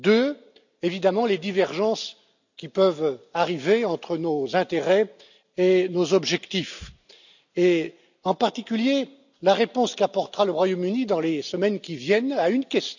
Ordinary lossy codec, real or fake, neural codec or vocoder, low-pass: none; real; none; 7.2 kHz